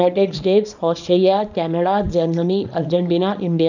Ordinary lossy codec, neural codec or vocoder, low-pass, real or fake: none; codec, 24 kHz, 0.9 kbps, WavTokenizer, small release; 7.2 kHz; fake